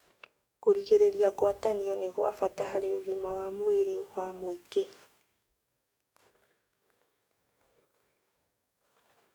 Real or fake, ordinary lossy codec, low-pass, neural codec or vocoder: fake; none; none; codec, 44.1 kHz, 2.6 kbps, DAC